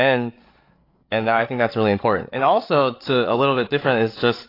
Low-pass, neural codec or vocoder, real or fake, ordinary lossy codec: 5.4 kHz; codec, 16 kHz, 8 kbps, FreqCodec, larger model; fake; AAC, 32 kbps